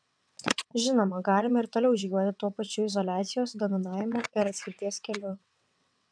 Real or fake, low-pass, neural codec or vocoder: fake; 9.9 kHz; vocoder, 22.05 kHz, 80 mel bands, WaveNeXt